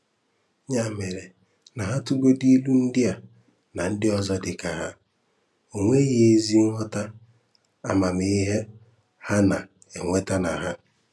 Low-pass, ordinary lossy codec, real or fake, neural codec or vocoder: none; none; real; none